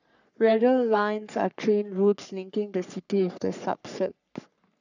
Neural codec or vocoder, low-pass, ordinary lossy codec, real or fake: codec, 44.1 kHz, 3.4 kbps, Pupu-Codec; 7.2 kHz; none; fake